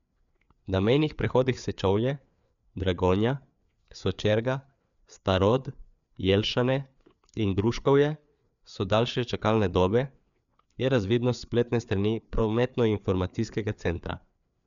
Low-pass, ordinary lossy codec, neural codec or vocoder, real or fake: 7.2 kHz; MP3, 96 kbps; codec, 16 kHz, 4 kbps, FreqCodec, larger model; fake